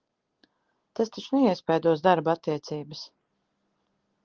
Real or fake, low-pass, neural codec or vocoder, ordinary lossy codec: real; 7.2 kHz; none; Opus, 16 kbps